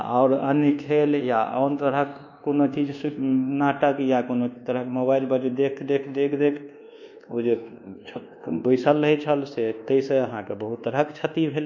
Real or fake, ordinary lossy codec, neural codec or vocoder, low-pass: fake; none; codec, 24 kHz, 1.2 kbps, DualCodec; 7.2 kHz